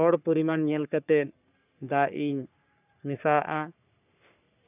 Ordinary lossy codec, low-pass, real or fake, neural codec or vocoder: none; 3.6 kHz; fake; codec, 44.1 kHz, 3.4 kbps, Pupu-Codec